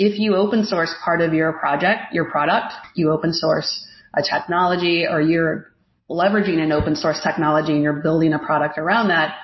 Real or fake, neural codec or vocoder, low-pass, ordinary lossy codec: real; none; 7.2 kHz; MP3, 24 kbps